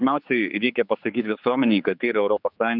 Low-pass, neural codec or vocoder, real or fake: 5.4 kHz; codec, 16 kHz, 4 kbps, X-Codec, HuBERT features, trained on general audio; fake